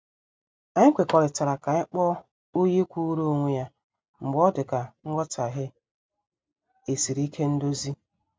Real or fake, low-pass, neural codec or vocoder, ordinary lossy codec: real; none; none; none